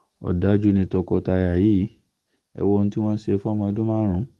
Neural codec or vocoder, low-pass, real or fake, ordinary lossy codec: codec, 44.1 kHz, 7.8 kbps, DAC; 19.8 kHz; fake; Opus, 24 kbps